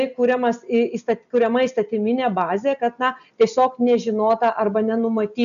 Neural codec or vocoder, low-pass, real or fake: none; 7.2 kHz; real